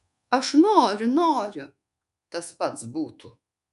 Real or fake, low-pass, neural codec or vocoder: fake; 10.8 kHz; codec, 24 kHz, 1.2 kbps, DualCodec